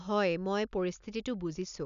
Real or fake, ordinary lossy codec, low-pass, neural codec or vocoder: real; none; 7.2 kHz; none